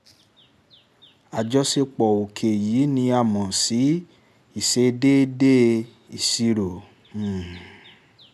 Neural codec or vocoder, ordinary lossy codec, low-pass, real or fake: none; none; 14.4 kHz; real